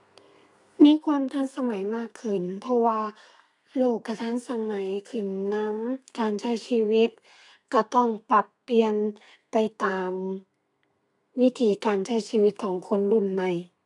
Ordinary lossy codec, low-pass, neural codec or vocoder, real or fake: none; 10.8 kHz; codec, 32 kHz, 1.9 kbps, SNAC; fake